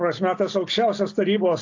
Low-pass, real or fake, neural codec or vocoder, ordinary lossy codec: 7.2 kHz; real; none; AAC, 48 kbps